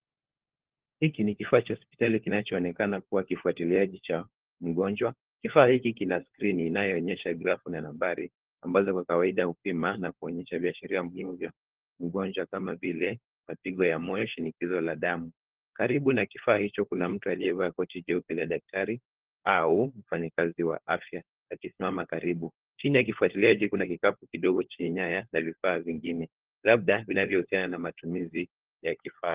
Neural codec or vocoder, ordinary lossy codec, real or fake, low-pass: codec, 16 kHz, 4 kbps, FunCodec, trained on LibriTTS, 50 frames a second; Opus, 16 kbps; fake; 3.6 kHz